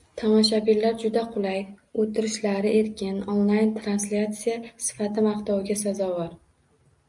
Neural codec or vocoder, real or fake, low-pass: none; real; 10.8 kHz